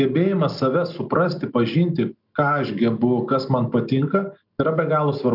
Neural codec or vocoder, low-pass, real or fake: none; 5.4 kHz; real